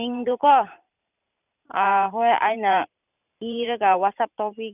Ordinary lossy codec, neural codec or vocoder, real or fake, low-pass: none; vocoder, 44.1 kHz, 128 mel bands every 512 samples, BigVGAN v2; fake; 3.6 kHz